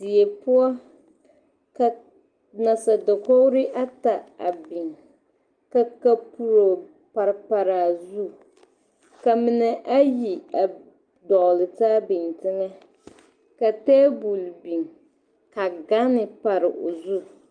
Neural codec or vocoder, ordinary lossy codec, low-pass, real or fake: none; Opus, 32 kbps; 9.9 kHz; real